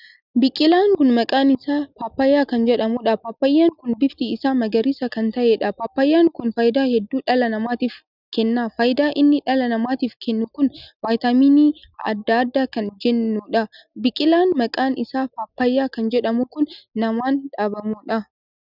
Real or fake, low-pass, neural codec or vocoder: real; 5.4 kHz; none